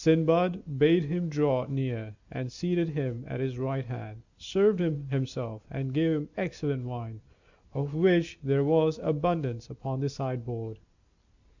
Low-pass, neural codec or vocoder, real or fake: 7.2 kHz; none; real